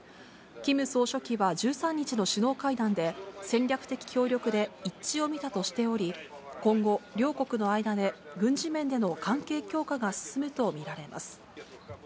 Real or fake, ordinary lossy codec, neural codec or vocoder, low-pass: real; none; none; none